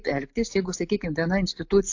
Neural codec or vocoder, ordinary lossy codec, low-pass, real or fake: none; AAC, 48 kbps; 7.2 kHz; real